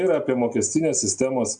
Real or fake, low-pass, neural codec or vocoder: real; 9.9 kHz; none